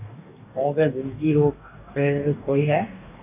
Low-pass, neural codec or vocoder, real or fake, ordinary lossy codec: 3.6 kHz; codec, 44.1 kHz, 2.6 kbps, DAC; fake; AAC, 32 kbps